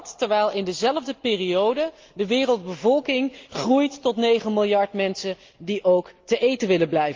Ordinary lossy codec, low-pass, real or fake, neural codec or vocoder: Opus, 24 kbps; 7.2 kHz; real; none